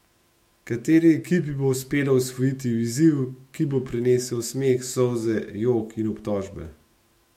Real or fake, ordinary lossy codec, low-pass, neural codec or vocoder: fake; MP3, 64 kbps; 19.8 kHz; autoencoder, 48 kHz, 128 numbers a frame, DAC-VAE, trained on Japanese speech